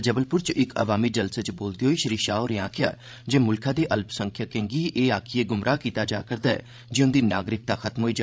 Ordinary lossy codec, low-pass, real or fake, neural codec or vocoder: none; none; fake; codec, 16 kHz, 16 kbps, FreqCodec, larger model